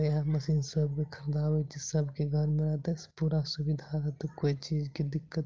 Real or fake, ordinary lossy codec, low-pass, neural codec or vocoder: real; Opus, 32 kbps; 7.2 kHz; none